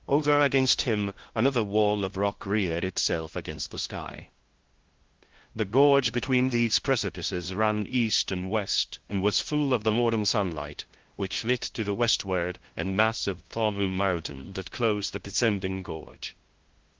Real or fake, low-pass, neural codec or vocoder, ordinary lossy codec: fake; 7.2 kHz; codec, 16 kHz, 0.5 kbps, FunCodec, trained on LibriTTS, 25 frames a second; Opus, 16 kbps